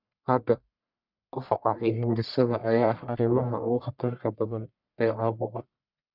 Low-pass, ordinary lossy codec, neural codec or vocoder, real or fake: 5.4 kHz; none; codec, 44.1 kHz, 1.7 kbps, Pupu-Codec; fake